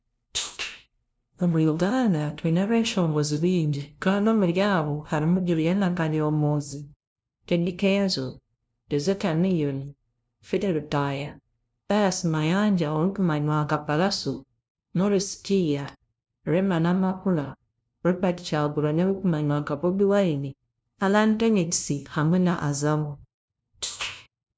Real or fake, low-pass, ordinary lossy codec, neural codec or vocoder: fake; none; none; codec, 16 kHz, 0.5 kbps, FunCodec, trained on LibriTTS, 25 frames a second